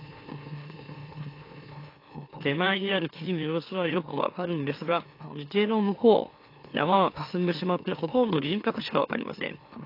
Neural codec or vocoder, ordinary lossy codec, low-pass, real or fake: autoencoder, 44.1 kHz, a latent of 192 numbers a frame, MeloTTS; AAC, 32 kbps; 5.4 kHz; fake